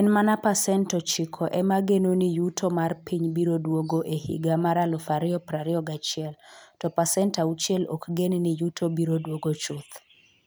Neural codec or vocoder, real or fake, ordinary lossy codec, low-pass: none; real; none; none